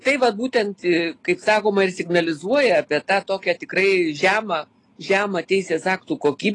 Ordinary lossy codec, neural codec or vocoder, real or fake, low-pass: AAC, 32 kbps; none; real; 10.8 kHz